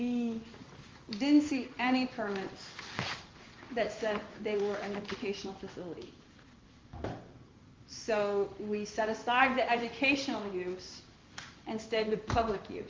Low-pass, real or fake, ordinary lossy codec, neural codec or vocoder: 7.2 kHz; fake; Opus, 32 kbps; codec, 16 kHz in and 24 kHz out, 1 kbps, XY-Tokenizer